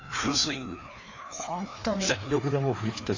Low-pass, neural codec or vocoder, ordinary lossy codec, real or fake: 7.2 kHz; codec, 16 kHz, 2 kbps, FreqCodec, larger model; AAC, 48 kbps; fake